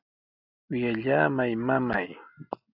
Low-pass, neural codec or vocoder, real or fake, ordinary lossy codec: 5.4 kHz; none; real; AAC, 48 kbps